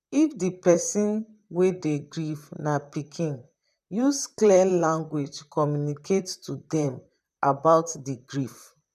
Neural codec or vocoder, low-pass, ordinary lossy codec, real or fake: vocoder, 44.1 kHz, 128 mel bands, Pupu-Vocoder; 14.4 kHz; none; fake